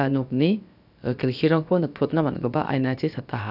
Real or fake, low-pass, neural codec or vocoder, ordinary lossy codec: fake; 5.4 kHz; codec, 16 kHz, about 1 kbps, DyCAST, with the encoder's durations; none